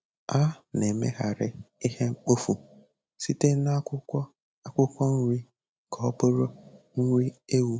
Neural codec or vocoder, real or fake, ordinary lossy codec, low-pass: none; real; none; none